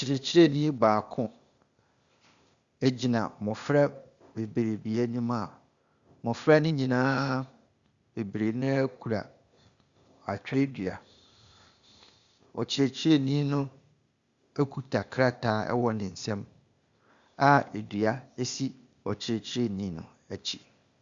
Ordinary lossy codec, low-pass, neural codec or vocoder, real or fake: Opus, 64 kbps; 7.2 kHz; codec, 16 kHz, 0.8 kbps, ZipCodec; fake